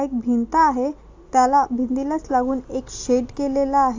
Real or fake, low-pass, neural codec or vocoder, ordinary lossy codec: real; 7.2 kHz; none; AAC, 48 kbps